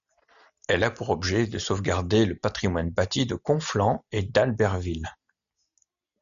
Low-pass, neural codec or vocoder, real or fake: 7.2 kHz; none; real